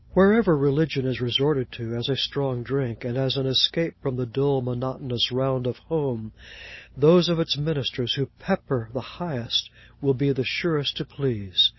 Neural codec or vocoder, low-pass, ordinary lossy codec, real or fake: none; 7.2 kHz; MP3, 24 kbps; real